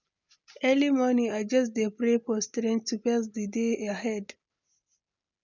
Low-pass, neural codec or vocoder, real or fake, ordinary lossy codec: 7.2 kHz; none; real; none